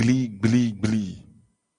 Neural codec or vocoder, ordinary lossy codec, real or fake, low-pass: none; Opus, 64 kbps; real; 9.9 kHz